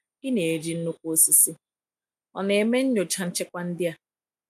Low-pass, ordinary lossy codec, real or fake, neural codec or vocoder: 14.4 kHz; none; real; none